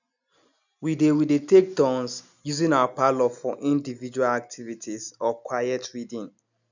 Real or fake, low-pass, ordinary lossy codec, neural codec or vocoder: real; 7.2 kHz; none; none